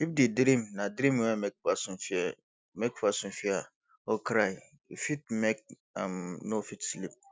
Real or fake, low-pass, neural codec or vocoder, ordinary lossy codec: real; none; none; none